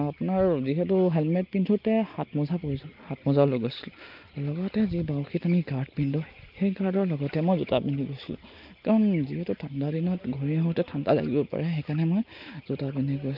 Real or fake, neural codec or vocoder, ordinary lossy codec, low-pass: real; none; Opus, 24 kbps; 5.4 kHz